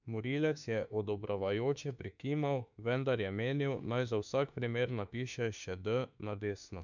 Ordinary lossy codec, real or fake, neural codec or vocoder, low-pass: none; fake; autoencoder, 48 kHz, 32 numbers a frame, DAC-VAE, trained on Japanese speech; 7.2 kHz